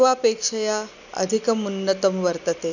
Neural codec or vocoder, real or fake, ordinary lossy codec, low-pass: none; real; none; 7.2 kHz